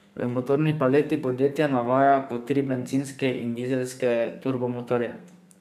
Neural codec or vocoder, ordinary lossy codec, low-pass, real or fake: codec, 32 kHz, 1.9 kbps, SNAC; none; 14.4 kHz; fake